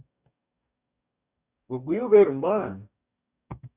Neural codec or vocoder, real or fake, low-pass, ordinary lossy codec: codec, 44.1 kHz, 2.6 kbps, DAC; fake; 3.6 kHz; Opus, 64 kbps